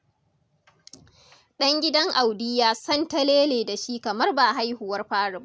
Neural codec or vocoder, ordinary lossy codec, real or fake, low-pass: none; none; real; none